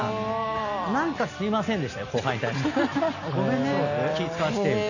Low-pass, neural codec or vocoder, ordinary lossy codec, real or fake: 7.2 kHz; none; none; real